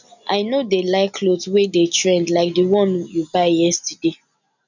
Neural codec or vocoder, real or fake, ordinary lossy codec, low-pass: none; real; none; 7.2 kHz